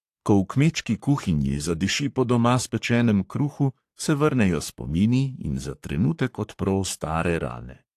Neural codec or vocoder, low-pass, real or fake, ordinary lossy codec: codec, 44.1 kHz, 3.4 kbps, Pupu-Codec; 14.4 kHz; fake; AAC, 64 kbps